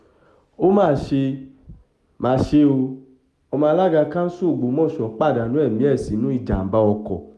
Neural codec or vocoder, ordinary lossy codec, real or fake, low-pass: none; none; real; none